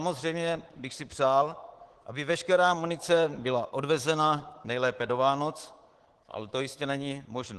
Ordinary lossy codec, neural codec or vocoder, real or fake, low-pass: Opus, 16 kbps; codec, 24 kHz, 3.1 kbps, DualCodec; fake; 10.8 kHz